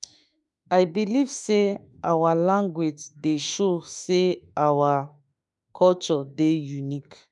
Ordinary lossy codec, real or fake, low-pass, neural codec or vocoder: none; fake; 10.8 kHz; autoencoder, 48 kHz, 32 numbers a frame, DAC-VAE, trained on Japanese speech